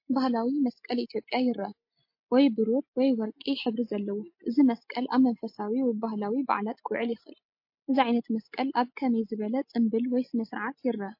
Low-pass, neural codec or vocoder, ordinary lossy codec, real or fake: 5.4 kHz; none; MP3, 32 kbps; real